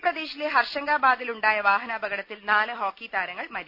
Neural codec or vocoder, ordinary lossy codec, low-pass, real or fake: none; none; 5.4 kHz; real